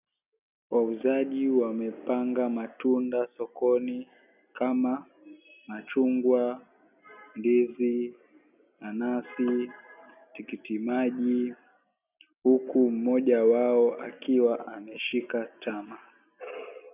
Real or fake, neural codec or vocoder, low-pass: real; none; 3.6 kHz